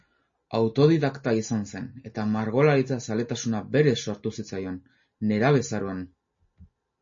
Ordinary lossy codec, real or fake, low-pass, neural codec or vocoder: MP3, 32 kbps; real; 7.2 kHz; none